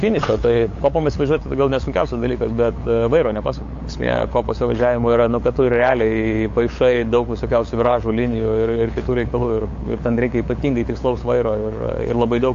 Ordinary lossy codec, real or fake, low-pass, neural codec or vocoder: AAC, 48 kbps; fake; 7.2 kHz; codec, 16 kHz, 8 kbps, FunCodec, trained on LibriTTS, 25 frames a second